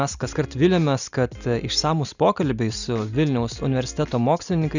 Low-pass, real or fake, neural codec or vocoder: 7.2 kHz; real; none